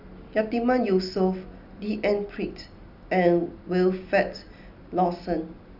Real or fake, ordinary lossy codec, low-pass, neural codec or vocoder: real; none; 5.4 kHz; none